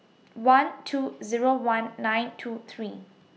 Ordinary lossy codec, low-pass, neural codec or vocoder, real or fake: none; none; none; real